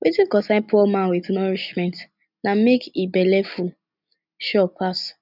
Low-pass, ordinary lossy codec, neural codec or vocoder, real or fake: 5.4 kHz; none; none; real